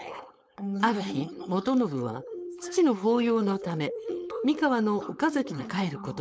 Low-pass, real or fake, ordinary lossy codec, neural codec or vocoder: none; fake; none; codec, 16 kHz, 4.8 kbps, FACodec